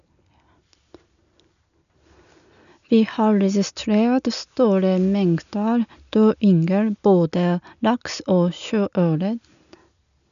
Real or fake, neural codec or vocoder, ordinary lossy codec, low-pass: real; none; none; 7.2 kHz